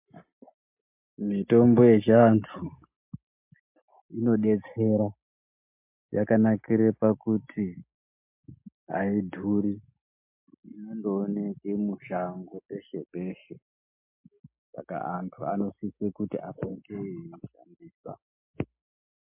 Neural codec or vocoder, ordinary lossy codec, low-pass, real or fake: none; MP3, 32 kbps; 3.6 kHz; real